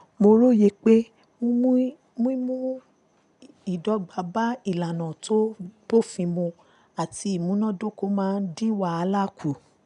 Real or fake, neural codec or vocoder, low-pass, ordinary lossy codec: real; none; 10.8 kHz; none